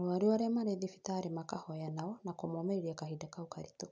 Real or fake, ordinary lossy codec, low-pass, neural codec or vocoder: real; none; none; none